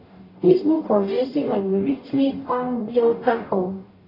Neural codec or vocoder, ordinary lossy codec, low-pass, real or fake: codec, 44.1 kHz, 0.9 kbps, DAC; AAC, 24 kbps; 5.4 kHz; fake